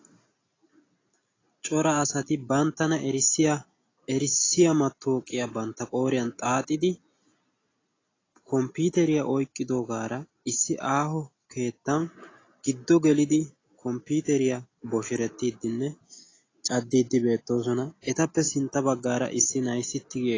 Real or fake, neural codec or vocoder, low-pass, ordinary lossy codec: real; none; 7.2 kHz; AAC, 32 kbps